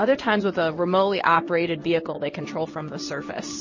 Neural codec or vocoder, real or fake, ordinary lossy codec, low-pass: codec, 16 kHz in and 24 kHz out, 1 kbps, XY-Tokenizer; fake; MP3, 32 kbps; 7.2 kHz